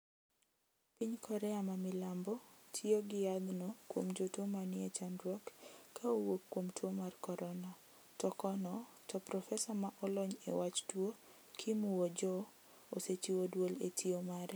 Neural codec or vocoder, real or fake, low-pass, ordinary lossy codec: none; real; none; none